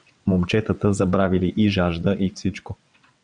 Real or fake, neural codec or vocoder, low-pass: fake; vocoder, 22.05 kHz, 80 mel bands, WaveNeXt; 9.9 kHz